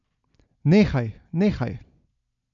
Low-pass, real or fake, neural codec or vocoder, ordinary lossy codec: 7.2 kHz; real; none; none